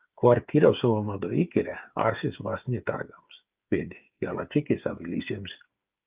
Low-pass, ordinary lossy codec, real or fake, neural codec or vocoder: 3.6 kHz; Opus, 24 kbps; fake; codec, 16 kHz, 8 kbps, FreqCodec, smaller model